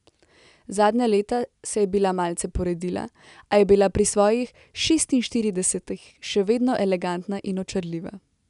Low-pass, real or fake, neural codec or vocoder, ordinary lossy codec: 10.8 kHz; real; none; none